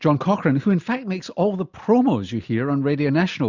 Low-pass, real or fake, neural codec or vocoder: 7.2 kHz; real; none